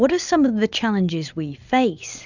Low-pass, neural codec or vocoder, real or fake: 7.2 kHz; none; real